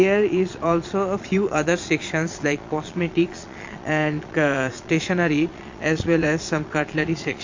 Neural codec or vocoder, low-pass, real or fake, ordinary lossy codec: vocoder, 22.05 kHz, 80 mel bands, Vocos; 7.2 kHz; fake; MP3, 48 kbps